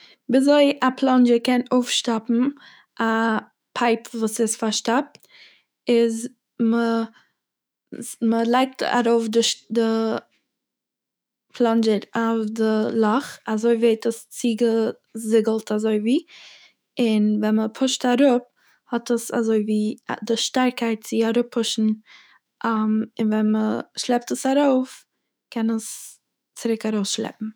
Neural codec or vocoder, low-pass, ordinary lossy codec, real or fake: autoencoder, 48 kHz, 128 numbers a frame, DAC-VAE, trained on Japanese speech; none; none; fake